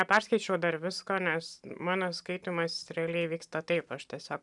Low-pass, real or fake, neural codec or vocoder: 10.8 kHz; real; none